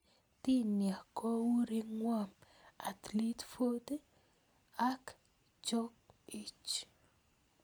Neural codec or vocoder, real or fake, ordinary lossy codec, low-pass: none; real; none; none